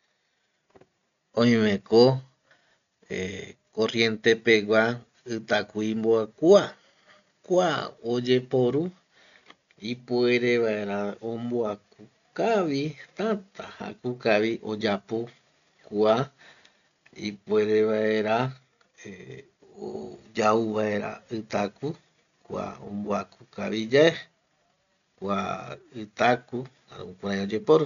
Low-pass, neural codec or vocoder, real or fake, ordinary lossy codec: 7.2 kHz; none; real; none